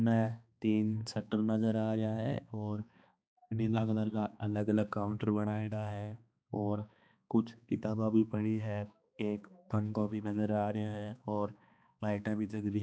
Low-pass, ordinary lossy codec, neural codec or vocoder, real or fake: none; none; codec, 16 kHz, 2 kbps, X-Codec, HuBERT features, trained on balanced general audio; fake